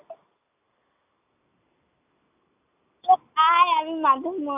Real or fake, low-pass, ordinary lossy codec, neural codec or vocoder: real; 3.6 kHz; none; none